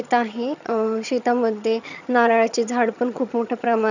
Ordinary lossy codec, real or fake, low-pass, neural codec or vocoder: none; fake; 7.2 kHz; vocoder, 22.05 kHz, 80 mel bands, HiFi-GAN